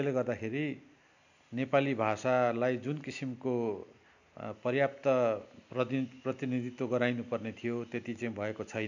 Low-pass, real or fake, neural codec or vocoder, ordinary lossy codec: 7.2 kHz; real; none; none